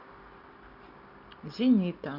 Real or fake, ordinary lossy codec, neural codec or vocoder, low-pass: real; none; none; 5.4 kHz